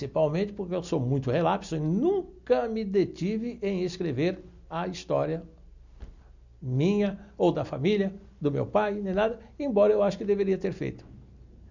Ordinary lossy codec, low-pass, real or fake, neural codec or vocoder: none; 7.2 kHz; real; none